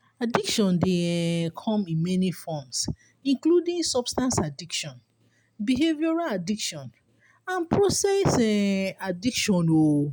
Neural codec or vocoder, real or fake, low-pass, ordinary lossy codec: none; real; none; none